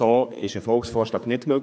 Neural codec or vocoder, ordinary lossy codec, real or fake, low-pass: codec, 16 kHz, 4 kbps, X-Codec, HuBERT features, trained on general audio; none; fake; none